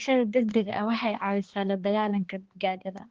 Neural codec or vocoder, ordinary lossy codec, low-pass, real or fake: codec, 16 kHz, 2 kbps, X-Codec, HuBERT features, trained on balanced general audio; Opus, 16 kbps; 7.2 kHz; fake